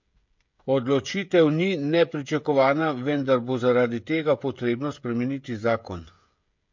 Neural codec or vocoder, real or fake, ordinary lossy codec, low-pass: codec, 16 kHz, 16 kbps, FreqCodec, smaller model; fake; MP3, 48 kbps; 7.2 kHz